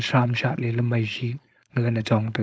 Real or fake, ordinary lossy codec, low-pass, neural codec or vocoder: fake; none; none; codec, 16 kHz, 4.8 kbps, FACodec